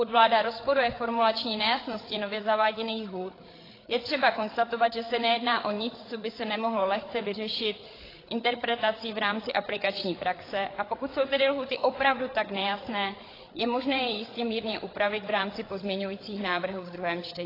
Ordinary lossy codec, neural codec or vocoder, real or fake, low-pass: AAC, 24 kbps; codec, 16 kHz, 8 kbps, FreqCodec, larger model; fake; 5.4 kHz